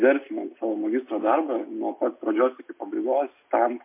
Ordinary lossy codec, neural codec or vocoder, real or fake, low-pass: MP3, 24 kbps; none; real; 3.6 kHz